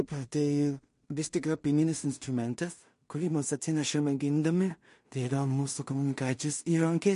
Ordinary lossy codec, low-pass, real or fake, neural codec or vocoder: MP3, 48 kbps; 10.8 kHz; fake; codec, 16 kHz in and 24 kHz out, 0.4 kbps, LongCat-Audio-Codec, two codebook decoder